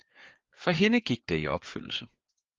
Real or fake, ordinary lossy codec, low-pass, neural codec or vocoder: fake; Opus, 32 kbps; 7.2 kHz; codec, 16 kHz, 4 kbps, FreqCodec, larger model